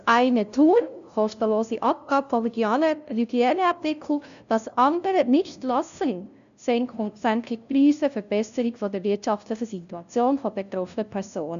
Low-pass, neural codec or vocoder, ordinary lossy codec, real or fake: 7.2 kHz; codec, 16 kHz, 0.5 kbps, FunCodec, trained on LibriTTS, 25 frames a second; none; fake